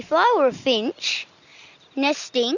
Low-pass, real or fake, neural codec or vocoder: 7.2 kHz; real; none